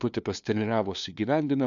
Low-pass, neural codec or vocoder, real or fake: 7.2 kHz; codec, 16 kHz, 2 kbps, FunCodec, trained on LibriTTS, 25 frames a second; fake